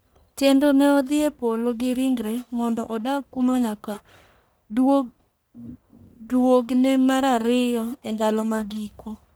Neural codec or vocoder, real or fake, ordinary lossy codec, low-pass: codec, 44.1 kHz, 1.7 kbps, Pupu-Codec; fake; none; none